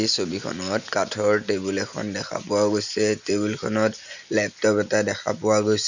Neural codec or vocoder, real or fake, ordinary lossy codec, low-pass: none; real; none; 7.2 kHz